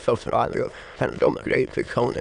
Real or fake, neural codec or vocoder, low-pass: fake; autoencoder, 22.05 kHz, a latent of 192 numbers a frame, VITS, trained on many speakers; 9.9 kHz